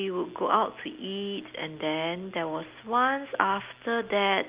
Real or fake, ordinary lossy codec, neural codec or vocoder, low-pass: real; Opus, 24 kbps; none; 3.6 kHz